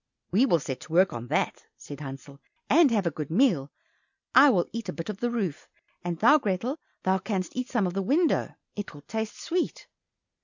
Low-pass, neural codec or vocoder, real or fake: 7.2 kHz; none; real